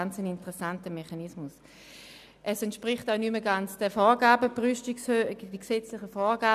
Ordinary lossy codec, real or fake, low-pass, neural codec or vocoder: none; real; 14.4 kHz; none